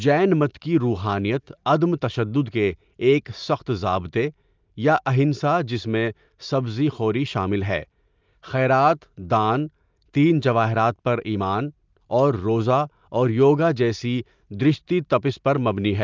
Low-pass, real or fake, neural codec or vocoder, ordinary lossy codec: 7.2 kHz; real; none; Opus, 24 kbps